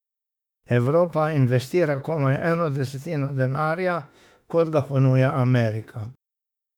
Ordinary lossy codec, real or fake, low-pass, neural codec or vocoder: none; fake; 19.8 kHz; autoencoder, 48 kHz, 32 numbers a frame, DAC-VAE, trained on Japanese speech